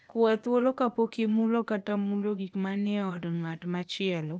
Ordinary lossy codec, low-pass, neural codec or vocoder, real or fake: none; none; codec, 16 kHz, 0.8 kbps, ZipCodec; fake